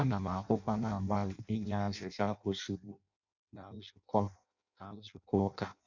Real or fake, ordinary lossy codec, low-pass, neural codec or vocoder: fake; none; 7.2 kHz; codec, 16 kHz in and 24 kHz out, 0.6 kbps, FireRedTTS-2 codec